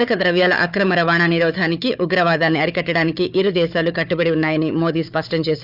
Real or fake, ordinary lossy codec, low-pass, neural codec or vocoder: fake; none; 5.4 kHz; codec, 16 kHz, 4 kbps, FunCodec, trained on Chinese and English, 50 frames a second